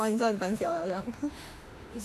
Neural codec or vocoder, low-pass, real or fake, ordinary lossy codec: autoencoder, 48 kHz, 32 numbers a frame, DAC-VAE, trained on Japanese speech; 14.4 kHz; fake; none